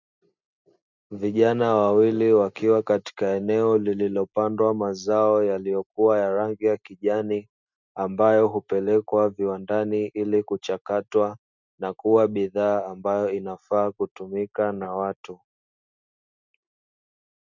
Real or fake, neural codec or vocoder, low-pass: real; none; 7.2 kHz